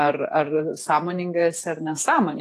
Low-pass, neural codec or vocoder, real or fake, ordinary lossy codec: 14.4 kHz; vocoder, 48 kHz, 128 mel bands, Vocos; fake; AAC, 48 kbps